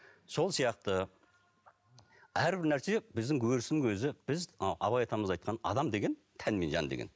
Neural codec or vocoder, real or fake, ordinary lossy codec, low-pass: none; real; none; none